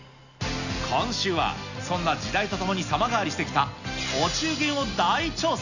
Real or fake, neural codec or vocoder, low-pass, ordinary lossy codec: real; none; 7.2 kHz; none